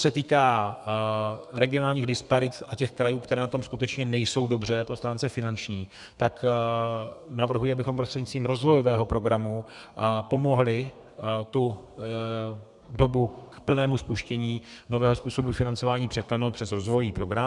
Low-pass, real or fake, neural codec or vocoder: 10.8 kHz; fake; codec, 32 kHz, 1.9 kbps, SNAC